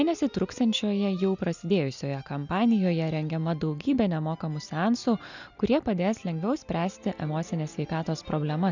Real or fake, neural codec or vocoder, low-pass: real; none; 7.2 kHz